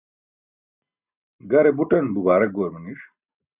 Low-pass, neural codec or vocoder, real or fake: 3.6 kHz; none; real